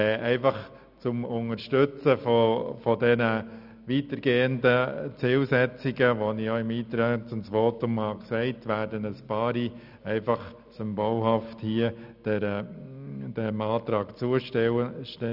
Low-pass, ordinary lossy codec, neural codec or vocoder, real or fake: 5.4 kHz; none; none; real